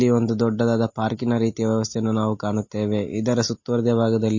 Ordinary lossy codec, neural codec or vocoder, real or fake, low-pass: MP3, 32 kbps; none; real; 7.2 kHz